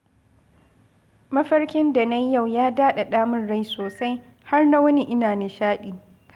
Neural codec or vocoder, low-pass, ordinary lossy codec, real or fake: none; 19.8 kHz; Opus, 32 kbps; real